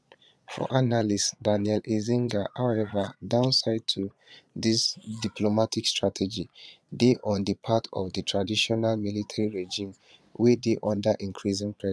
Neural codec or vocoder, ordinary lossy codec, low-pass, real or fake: vocoder, 22.05 kHz, 80 mel bands, Vocos; none; none; fake